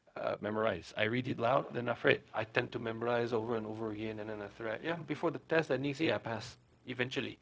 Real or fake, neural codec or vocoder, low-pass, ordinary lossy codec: fake; codec, 16 kHz, 0.4 kbps, LongCat-Audio-Codec; none; none